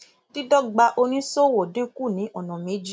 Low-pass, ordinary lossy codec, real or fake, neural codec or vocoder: none; none; real; none